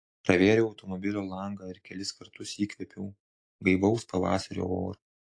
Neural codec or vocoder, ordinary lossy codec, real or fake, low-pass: none; AAC, 48 kbps; real; 9.9 kHz